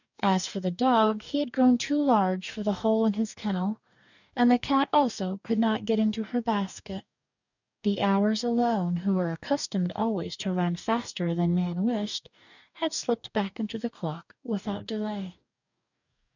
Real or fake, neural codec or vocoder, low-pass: fake; codec, 44.1 kHz, 2.6 kbps, DAC; 7.2 kHz